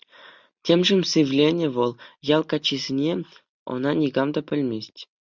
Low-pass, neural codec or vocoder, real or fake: 7.2 kHz; none; real